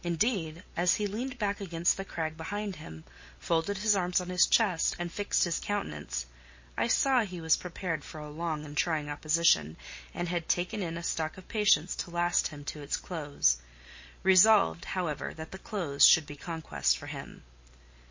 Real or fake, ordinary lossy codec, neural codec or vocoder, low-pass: real; MP3, 32 kbps; none; 7.2 kHz